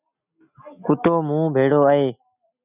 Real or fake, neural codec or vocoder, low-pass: real; none; 3.6 kHz